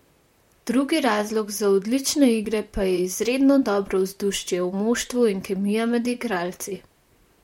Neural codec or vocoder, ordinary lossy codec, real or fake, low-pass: vocoder, 44.1 kHz, 128 mel bands, Pupu-Vocoder; MP3, 64 kbps; fake; 19.8 kHz